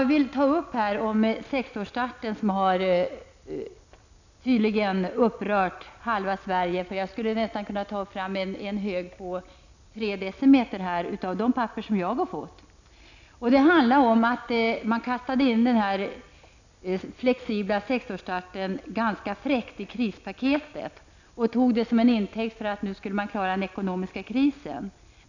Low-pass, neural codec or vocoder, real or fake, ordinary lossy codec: 7.2 kHz; none; real; none